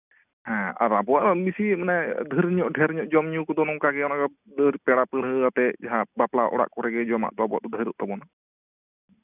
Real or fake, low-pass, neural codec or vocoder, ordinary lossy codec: real; 3.6 kHz; none; none